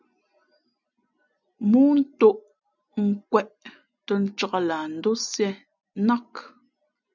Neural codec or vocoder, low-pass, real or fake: none; 7.2 kHz; real